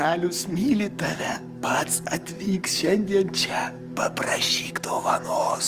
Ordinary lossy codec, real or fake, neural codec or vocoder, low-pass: Opus, 32 kbps; fake; codec, 44.1 kHz, 7.8 kbps, DAC; 14.4 kHz